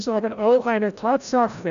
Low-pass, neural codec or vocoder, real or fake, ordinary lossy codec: 7.2 kHz; codec, 16 kHz, 0.5 kbps, FreqCodec, larger model; fake; none